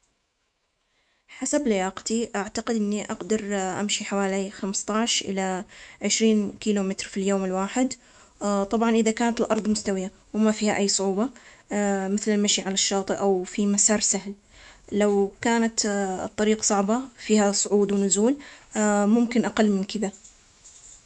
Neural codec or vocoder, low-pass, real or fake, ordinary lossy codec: autoencoder, 48 kHz, 128 numbers a frame, DAC-VAE, trained on Japanese speech; 10.8 kHz; fake; none